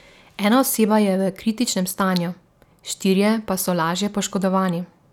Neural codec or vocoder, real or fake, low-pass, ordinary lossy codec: none; real; none; none